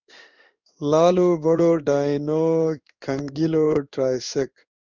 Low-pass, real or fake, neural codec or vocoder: 7.2 kHz; fake; codec, 16 kHz in and 24 kHz out, 1 kbps, XY-Tokenizer